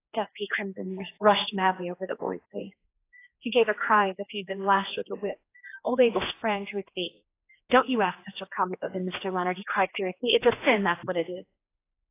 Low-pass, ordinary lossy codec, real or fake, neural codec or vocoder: 3.6 kHz; AAC, 24 kbps; fake; codec, 16 kHz, 2 kbps, X-Codec, HuBERT features, trained on general audio